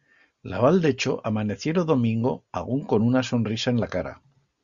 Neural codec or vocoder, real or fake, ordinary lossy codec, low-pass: none; real; Opus, 64 kbps; 7.2 kHz